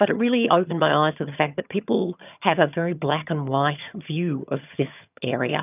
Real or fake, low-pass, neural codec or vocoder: fake; 3.6 kHz; vocoder, 22.05 kHz, 80 mel bands, HiFi-GAN